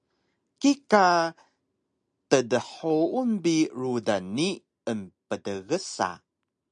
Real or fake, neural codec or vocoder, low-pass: real; none; 9.9 kHz